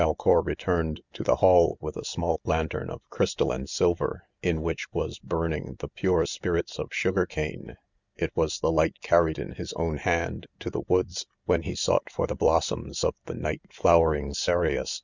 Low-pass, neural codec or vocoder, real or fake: 7.2 kHz; none; real